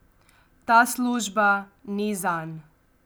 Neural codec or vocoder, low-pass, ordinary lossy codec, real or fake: none; none; none; real